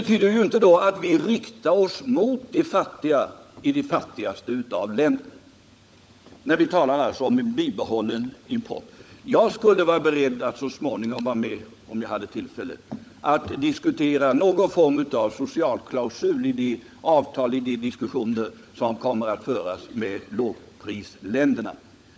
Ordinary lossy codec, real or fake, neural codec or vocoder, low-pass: none; fake; codec, 16 kHz, 16 kbps, FunCodec, trained on LibriTTS, 50 frames a second; none